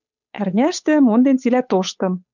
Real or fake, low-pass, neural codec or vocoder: fake; 7.2 kHz; codec, 16 kHz, 8 kbps, FunCodec, trained on Chinese and English, 25 frames a second